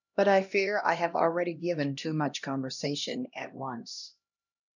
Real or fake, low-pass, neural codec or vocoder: fake; 7.2 kHz; codec, 16 kHz, 1 kbps, X-Codec, HuBERT features, trained on LibriSpeech